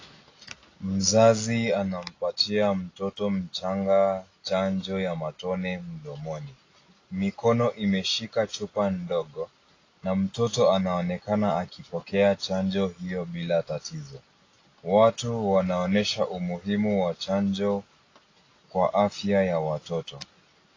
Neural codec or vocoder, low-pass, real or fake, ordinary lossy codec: none; 7.2 kHz; real; AAC, 32 kbps